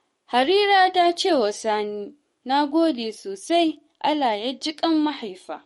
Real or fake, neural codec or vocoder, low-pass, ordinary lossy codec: fake; codec, 44.1 kHz, 7.8 kbps, DAC; 19.8 kHz; MP3, 48 kbps